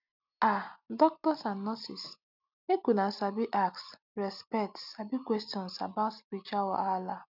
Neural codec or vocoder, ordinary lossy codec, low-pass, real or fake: none; none; 5.4 kHz; real